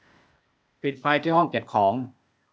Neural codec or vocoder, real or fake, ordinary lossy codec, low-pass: codec, 16 kHz, 0.8 kbps, ZipCodec; fake; none; none